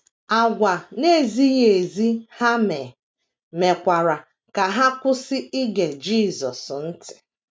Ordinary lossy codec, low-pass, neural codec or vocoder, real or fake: none; none; none; real